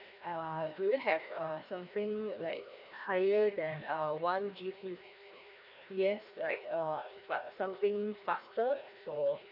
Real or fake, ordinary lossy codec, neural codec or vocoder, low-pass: fake; none; codec, 16 kHz, 1 kbps, FreqCodec, larger model; 5.4 kHz